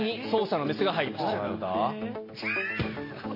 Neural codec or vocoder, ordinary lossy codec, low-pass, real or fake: none; MP3, 24 kbps; 5.4 kHz; real